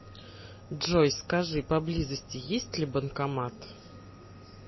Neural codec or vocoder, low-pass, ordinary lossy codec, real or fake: none; 7.2 kHz; MP3, 24 kbps; real